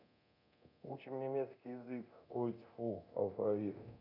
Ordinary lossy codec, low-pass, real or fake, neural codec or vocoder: none; 5.4 kHz; fake; codec, 24 kHz, 0.9 kbps, DualCodec